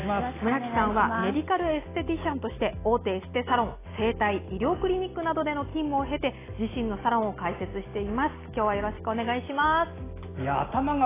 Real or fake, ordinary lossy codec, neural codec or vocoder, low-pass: real; AAC, 16 kbps; none; 3.6 kHz